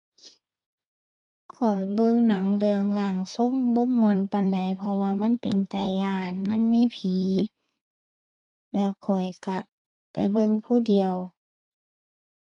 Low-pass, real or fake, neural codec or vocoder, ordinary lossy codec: 10.8 kHz; fake; codec, 24 kHz, 1 kbps, SNAC; none